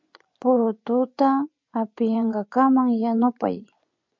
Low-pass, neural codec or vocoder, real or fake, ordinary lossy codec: 7.2 kHz; vocoder, 24 kHz, 100 mel bands, Vocos; fake; MP3, 48 kbps